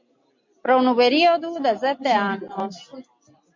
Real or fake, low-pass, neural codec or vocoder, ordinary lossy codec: real; 7.2 kHz; none; MP3, 48 kbps